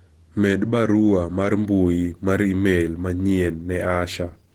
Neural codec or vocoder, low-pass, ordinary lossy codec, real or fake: vocoder, 48 kHz, 128 mel bands, Vocos; 19.8 kHz; Opus, 16 kbps; fake